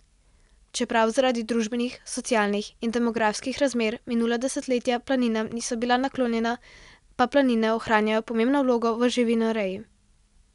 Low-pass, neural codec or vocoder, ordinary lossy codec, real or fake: 10.8 kHz; none; none; real